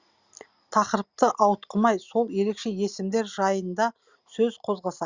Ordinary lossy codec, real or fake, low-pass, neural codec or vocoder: Opus, 64 kbps; real; 7.2 kHz; none